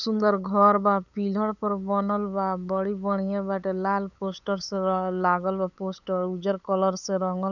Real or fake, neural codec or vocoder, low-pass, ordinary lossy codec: fake; codec, 16 kHz, 8 kbps, FunCodec, trained on LibriTTS, 25 frames a second; 7.2 kHz; none